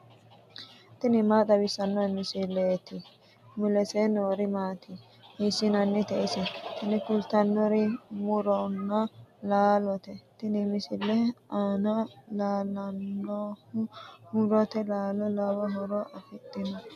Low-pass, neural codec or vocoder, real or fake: 14.4 kHz; none; real